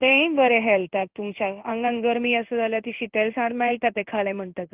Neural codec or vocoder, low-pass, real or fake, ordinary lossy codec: codec, 16 kHz in and 24 kHz out, 1 kbps, XY-Tokenizer; 3.6 kHz; fake; Opus, 24 kbps